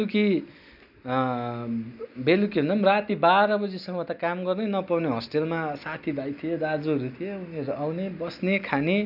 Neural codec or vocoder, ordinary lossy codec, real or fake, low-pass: none; none; real; 5.4 kHz